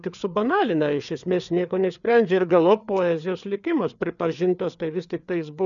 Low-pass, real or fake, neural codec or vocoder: 7.2 kHz; fake; codec, 16 kHz, 4 kbps, FreqCodec, larger model